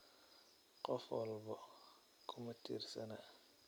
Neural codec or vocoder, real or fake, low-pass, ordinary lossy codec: none; real; none; none